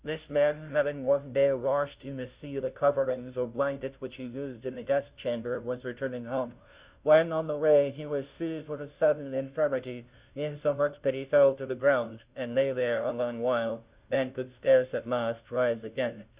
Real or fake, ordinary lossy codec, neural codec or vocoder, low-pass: fake; Opus, 64 kbps; codec, 16 kHz, 0.5 kbps, FunCodec, trained on Chinese and English, 25 frames a second; 3.6 kHz